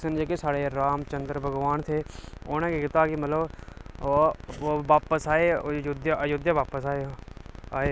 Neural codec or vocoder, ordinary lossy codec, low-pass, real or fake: none; none; none; real